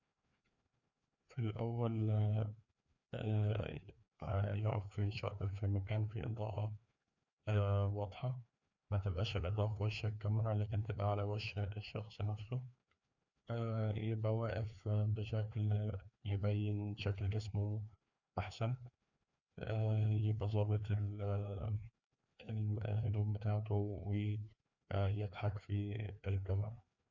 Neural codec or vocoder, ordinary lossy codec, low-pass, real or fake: codec, 16 kHz, 2 kbps, FreqCodec, larger model; none; 7.2 kHz; fake